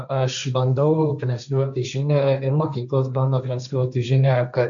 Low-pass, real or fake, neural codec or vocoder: 7.2 kHz; fake; codec, 16 kHz, 1.1 kbps, Voila-Tokenizer